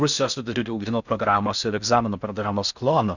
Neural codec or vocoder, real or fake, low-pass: codec, 16 kHz in and 24 kHz out, 0.6 kbps, FocalCodec, streaming, 4096 codes; fake; 7.2 kHz